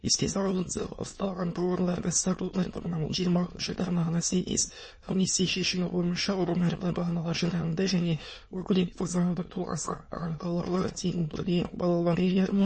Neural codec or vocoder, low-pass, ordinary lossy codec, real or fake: autoencoder, 22.05 kHz, a latent of 192 numbers a frame, VITS, trained on many speakers; 9.9 kHz; MP3, 32 kbps; fake